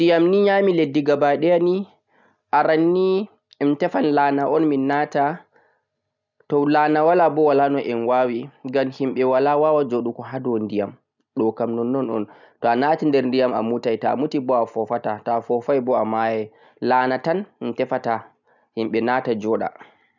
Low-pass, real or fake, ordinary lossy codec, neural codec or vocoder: 7.2 kHz; real; none; none